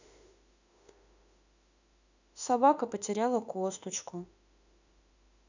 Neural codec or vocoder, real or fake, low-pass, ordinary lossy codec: autoencoder, 48 kHz, 32 numbers a frame, DAC-VAE, trained on Japanese speech; fake; 7.2 kHz; none